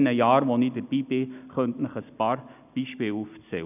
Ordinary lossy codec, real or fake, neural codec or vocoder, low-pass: none; real; none; 3.6 kHz